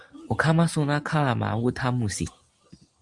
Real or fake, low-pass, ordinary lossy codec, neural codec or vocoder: real; 10.8 kHz; Opus, 32 kbps; none